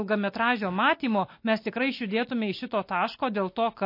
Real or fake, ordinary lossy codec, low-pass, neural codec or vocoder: real; MP3, 32 kbps; 5.4 kHz; none